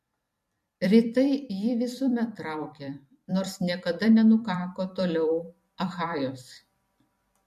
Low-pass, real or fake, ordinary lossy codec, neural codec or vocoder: 14.4 kHz; fake; MP3, 64 kbps; vocoder, 44.1 kHz, 128 mel bands every 256 samples, BigVGAN v2